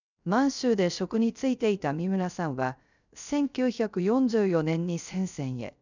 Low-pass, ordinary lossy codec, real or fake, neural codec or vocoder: 7.2 kHz; none; fake; codec, 16 kHz, 0.3 kbps, FocalCodec